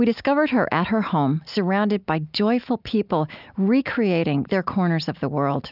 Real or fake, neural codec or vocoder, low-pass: real; none; 5.4 kHz